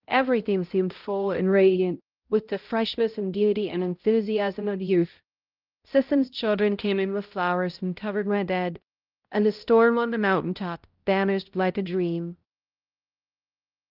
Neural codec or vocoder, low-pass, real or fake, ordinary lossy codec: codec, 16 kHz, 0.5 kbps, X-Codec, HuBERT features, trained on balanced general audio; 5.4 kHz; fake; Opus, 32 kbps